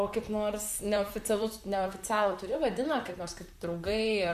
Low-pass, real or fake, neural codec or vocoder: 14.4 kHz; fake; vocoder, 44.1 kHz, 128 mel bands, Pupu-Vocoder